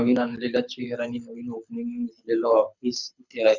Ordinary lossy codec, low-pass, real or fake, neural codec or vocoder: none; 7.2 kHz; fake; codec, 24 kHz, 6 kbps, HILCodec